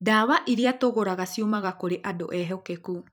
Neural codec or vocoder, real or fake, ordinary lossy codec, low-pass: vocoder, 44.1 kHz, 128 mel bands every 256 samples, BigVGAN v2; fake; none; none